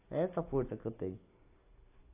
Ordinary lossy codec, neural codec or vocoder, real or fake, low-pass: AAC, 24 kbps; none; real; 3.6 kHz